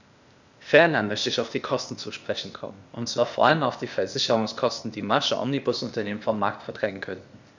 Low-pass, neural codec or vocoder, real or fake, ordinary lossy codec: 7.2 kHz; codec, 16 kHz, 0.8 kbps, ZipCodec; fake; none